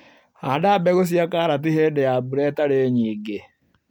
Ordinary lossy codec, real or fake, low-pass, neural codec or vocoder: none; real; 19.8 kHz; none